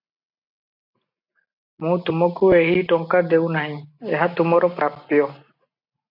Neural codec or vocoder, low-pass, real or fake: none; 5.4 kHz; real